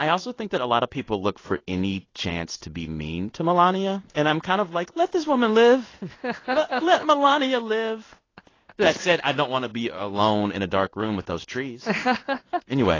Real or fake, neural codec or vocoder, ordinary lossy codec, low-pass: fake; codec, 16 kHz in and 24 kHz out, 1 kbps, XY-Tokenizer; AAC, 32 kbps; 7.2 kHz